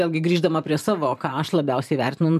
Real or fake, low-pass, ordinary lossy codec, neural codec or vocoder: fake; 14.4 kHz; MP3, 96 kbps; vocoder, 44.1 kHz, 128 mel bands every 512 samples, BigVGAN v2